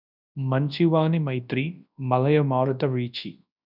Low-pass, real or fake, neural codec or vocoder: 5.4 kHz; fake; codec, 24 kHz, 0.9 kbps, WavTokenizer, large speech release